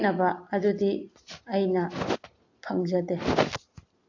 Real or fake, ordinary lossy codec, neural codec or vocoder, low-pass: real; AAC, 48 kbps; none; 7.2 kHz